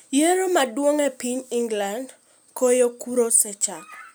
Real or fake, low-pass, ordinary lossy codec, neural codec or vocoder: real; none; none; none